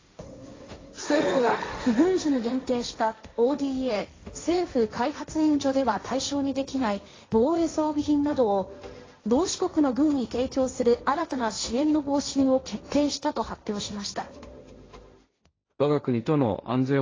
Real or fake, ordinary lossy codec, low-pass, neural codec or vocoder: fake; AAC, 32 kbps; 7.2 kHz; codec, 16 kHz, 1.1 kbps, Voila-Tokenizer